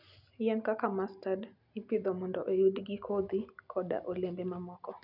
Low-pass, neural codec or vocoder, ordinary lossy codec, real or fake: 5.4 kHz; none; none; real